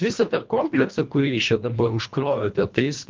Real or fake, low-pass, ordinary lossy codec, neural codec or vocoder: fake; 7.2 kHz; Opus, 32 kbps; codec, 24 kHz, 1.5 kbps, HILCodec